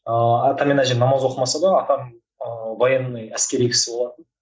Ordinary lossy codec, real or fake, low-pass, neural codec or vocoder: none; real; none; none